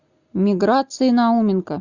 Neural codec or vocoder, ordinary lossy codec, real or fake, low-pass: none; Opus, 64 kbps; real; 7.2 kHz